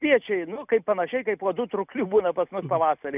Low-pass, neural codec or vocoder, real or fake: 3.6 kHz; none; real